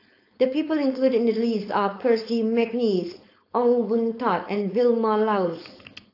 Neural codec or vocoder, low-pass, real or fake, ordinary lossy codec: codec, 16 kHz, 4.8 kbps, FACodec; 5.4 kHz; fake; AAC, 32 kbps